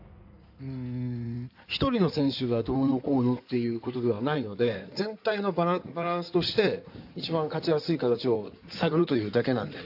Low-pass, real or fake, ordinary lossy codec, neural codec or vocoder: 5.4 kHz; fake; AAC, 48 kbps; codec, 16 kHz in and 24 kHz out, 2.2 kbps, FireRedTTS-2 codec